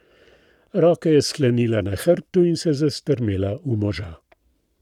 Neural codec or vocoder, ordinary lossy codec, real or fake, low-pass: codec, 44.1 kHz, 7.8 kbps, Pupu-Codec; none; fake; 19.8 kHz